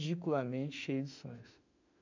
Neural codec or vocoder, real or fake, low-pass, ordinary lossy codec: autoencoder, 48 kHz, 32 numbers a frame, DAC-VAE, trained on Japanese speech; fake; 7.2 kHz; none